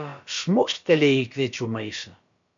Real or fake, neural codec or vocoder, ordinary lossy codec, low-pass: fake; codec, 16 kHz, about 1 kbps, DyCAST, with the encoder's durations; MP3, 48 kbps; 7.2 kHz